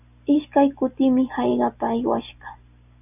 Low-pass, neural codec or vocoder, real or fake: 3.6 kHz; none; real